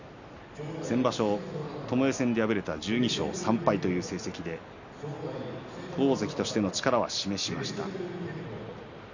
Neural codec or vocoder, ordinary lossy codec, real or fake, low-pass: none; none; real; 7.2 kHz